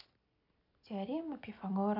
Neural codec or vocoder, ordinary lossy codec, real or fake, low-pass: none; none; real; 5.4 kHz